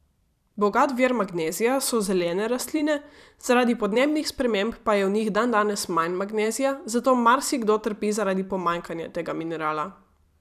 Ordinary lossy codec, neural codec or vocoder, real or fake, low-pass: none; vocoder, 44.1 kHz, 128 mel bands every 256 samples, BigVGAN v2; fake; 14.4 kHz